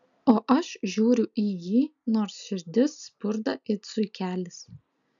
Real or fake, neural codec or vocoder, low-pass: real; none; 7.2 kHz